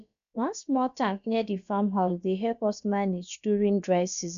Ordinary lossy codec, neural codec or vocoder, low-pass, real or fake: none; codec, 16 kHz, about 1 kbps, DyCAST, with the encoder's durations; 7.2 kHz; fake